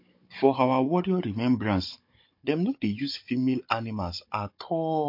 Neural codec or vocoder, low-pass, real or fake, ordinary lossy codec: codec, 16 kHz, 16 kbps, FunCodec, trained on Chinese and English, 50 frames a second; 5.4 kHz; fake; MP3, 32 kbps